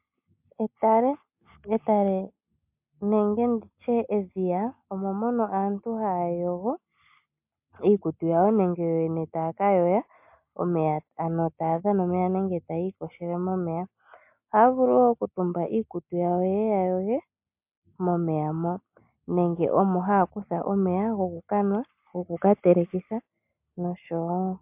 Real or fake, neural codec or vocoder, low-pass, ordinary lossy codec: real; none; 3.6 kHz; MP3, 32 kbps